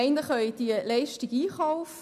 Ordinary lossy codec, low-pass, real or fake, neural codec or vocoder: none; 14.4 kHz; real; none